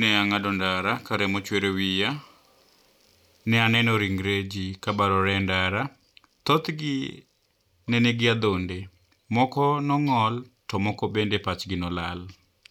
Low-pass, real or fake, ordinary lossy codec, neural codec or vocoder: 19.8 kHz; real; none; none